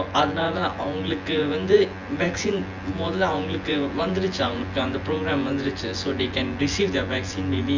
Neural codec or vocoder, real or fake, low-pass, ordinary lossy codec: vocoder, 24 kHz, 100 mel bands, Vocos; fake; 7.2 kHz; Opus, 32 kbps